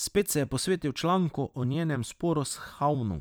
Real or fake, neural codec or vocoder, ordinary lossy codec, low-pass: fake; vocoder, 44.1 kHz, 128 mel bands every 256 samples, BigVGAN v2; none; none